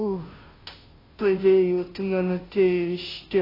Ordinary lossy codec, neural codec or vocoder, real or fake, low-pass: AAC, 24 kbps; codec, 16 kHz, 0.5 kbps, FunCodec, trained on Chinese and English, 25 frames a second; fake; 5.4 kHz